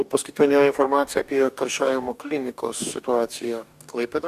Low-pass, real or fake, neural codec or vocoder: 14.4 kHz; fake; codec, 44.1 kHz, 2.6 kbps, DAC